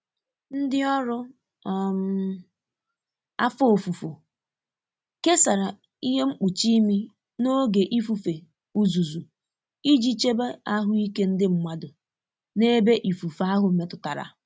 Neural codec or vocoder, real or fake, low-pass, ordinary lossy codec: none; real; none; none